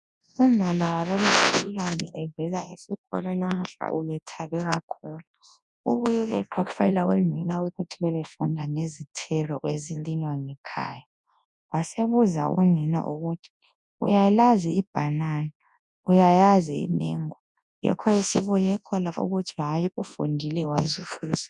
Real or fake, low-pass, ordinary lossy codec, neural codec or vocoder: fake; 10.8 kHz; MP3, 96 kbps; codec, 24 kHz, 0.9 kbps, WavTokenizer, large speech release